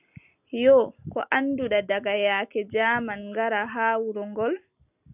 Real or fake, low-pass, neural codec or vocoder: real; 3.6 kHz; none